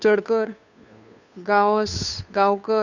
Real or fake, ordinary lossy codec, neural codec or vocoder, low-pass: fake; none; codec, 16 kHz, 2 kbps, FunCodec, trained on Chinese and English, 25 frames a second; 7.2 kHz